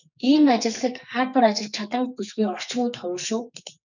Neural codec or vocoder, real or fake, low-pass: codec, 44.1 kHz, 2.6 kbps, SNAC; fake; 7.2 kHz